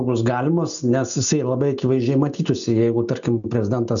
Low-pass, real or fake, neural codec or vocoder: 7.2 kHz; real; none